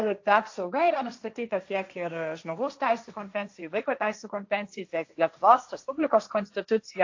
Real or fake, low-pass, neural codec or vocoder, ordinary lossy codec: fake; 7.2 kHz; codec, 16 kHz, 1.1 kbps, Voila-Tokenizer; AAC, 48 kbps